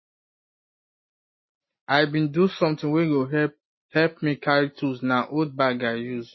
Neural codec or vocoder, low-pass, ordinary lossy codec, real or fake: none; 7.2 kHz; MP3, 24 kbps; real